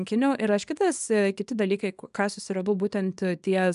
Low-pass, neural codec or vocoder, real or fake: 10.8 kHz; codec, 24 kHz, 0.9 kbps, WavTokenizer, small release; fake